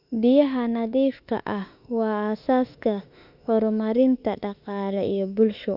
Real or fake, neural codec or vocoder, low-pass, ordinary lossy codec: fake; autoencoder, 48 kHz, 32 numbers a frame, DAC-VAE, trained on Japanese speech; 5.4 kHz; Opus, 64 kbps